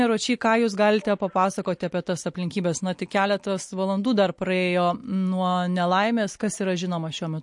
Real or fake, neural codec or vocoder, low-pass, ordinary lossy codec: real; none; 10.8 kHz; MP3, 48 kbps